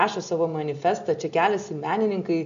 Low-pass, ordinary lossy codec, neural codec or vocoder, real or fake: 7.2 kHz; AAC, 64 kbps; none; real